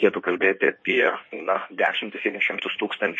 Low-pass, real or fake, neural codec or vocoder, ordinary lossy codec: 9.9 kHz; fake; codec, 16 kHz in and 24 kHz out, 1.1 kbps, FireRedTTS-2 codec; MP3, 32 kbps